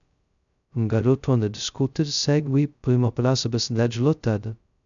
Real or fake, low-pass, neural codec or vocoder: fake; 7.2 kHz; codec, 16 kHz, 0.2 kbps, FocalCodec